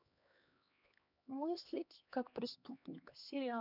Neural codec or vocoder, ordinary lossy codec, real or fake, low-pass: codec, 16 kHz, 2 kbps, X-Codec, HuBERT features, trained on LibriSpeech; none; fake; 5.4 kHz